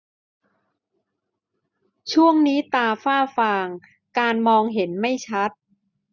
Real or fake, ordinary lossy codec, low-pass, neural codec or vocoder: real; none; 7.2 kHz; none